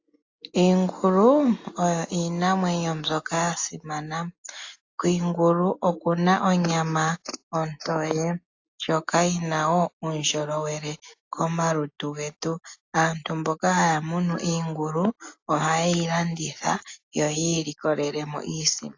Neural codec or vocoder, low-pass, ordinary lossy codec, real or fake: none; 7.2 kHz; AAC, 48 kbps; real